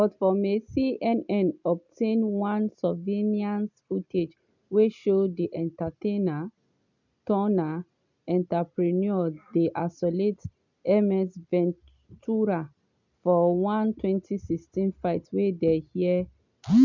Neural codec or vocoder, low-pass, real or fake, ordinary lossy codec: none; 7.2 kHz; real; none